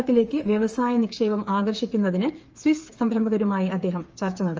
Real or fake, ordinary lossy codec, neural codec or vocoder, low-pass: fake; Opus, 24 kbps; codec, 16 kHz, 8 kbps, FreqCodec, smaller model; 7.2 kHz